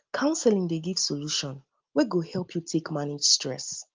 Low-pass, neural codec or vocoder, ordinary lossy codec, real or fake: 7.2 kHz; none; Opus, 32 kbps; real